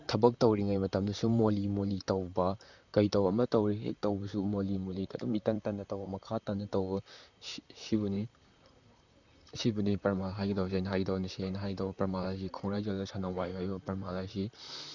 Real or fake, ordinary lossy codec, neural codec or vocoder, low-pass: fake; none; vocoder, 44.1 kHz, 128 mel bands, Pupu-Vocoder; 7.2 kHz